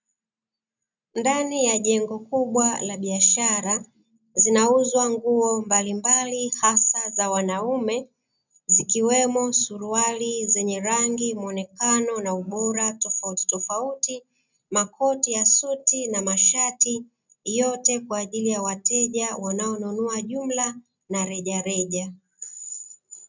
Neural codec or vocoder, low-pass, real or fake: none; 7.2 kHz; real